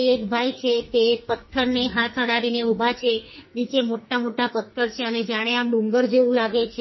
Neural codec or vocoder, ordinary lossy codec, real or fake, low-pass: codec, 44.1 kHz, 2.6 kbps, SNAC; MP3, 24 kbps; fake; 7.2 kHz